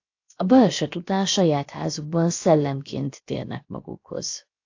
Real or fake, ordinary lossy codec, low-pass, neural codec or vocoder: fake; AAC, 48 kbps; 7.2 kHz; codec, 16 kHz, about 1 kbps, DyCAST, with the encoder's durations